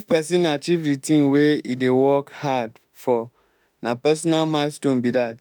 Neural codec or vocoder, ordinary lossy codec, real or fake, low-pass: autoencoder, 48 kHz, 32 numbers a frame, DAC-VAE, trained on Japanese speech; none; fake; none